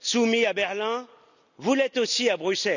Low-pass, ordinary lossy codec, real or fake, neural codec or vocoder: 7.2 kHz; none; real; none